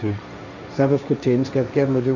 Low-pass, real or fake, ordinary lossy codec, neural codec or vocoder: 7.2 kHz; fake; none; codec, 16 kHz, 1.1 kbps, Voila-Tokenizer